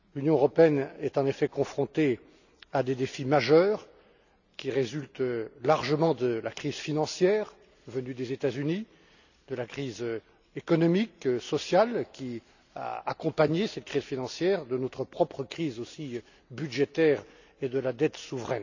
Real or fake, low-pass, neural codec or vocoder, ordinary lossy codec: real; 7.2 kHz; none; none